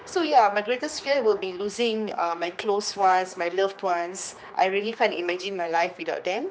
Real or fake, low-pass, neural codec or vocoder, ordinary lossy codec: fake; none; codec, 16 kHz, 2 kbps, X-Codec, HuBERT features, trained on general audio; none